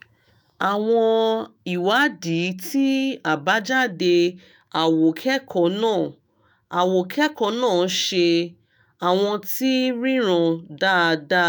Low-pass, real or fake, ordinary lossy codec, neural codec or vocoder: none; fake; none; autoencoder, 48 kHz, 128 numbers a frame, DAC-VAE, trained on Japanese speech